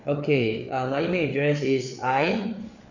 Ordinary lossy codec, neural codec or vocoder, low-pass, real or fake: none; codec, 16 kHz, 4 kbps, FreqCodec, larger model; 7.2 kHz; fake